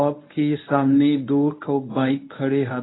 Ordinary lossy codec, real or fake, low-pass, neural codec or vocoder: AAC, 16 kbps; fake; 7.2 kHz; codec, 24 kHz, 0.9 kbps, WavTokenizer, medium speech release version 1